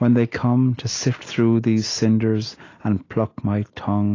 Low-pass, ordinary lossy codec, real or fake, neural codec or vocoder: 7.2 kHz; AAC, 32 kbps; real; none